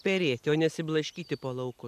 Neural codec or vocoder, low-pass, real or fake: none; 14.4 kHz; real